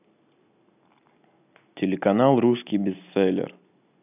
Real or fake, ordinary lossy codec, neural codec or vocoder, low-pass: real; none; none; 3.6 kHz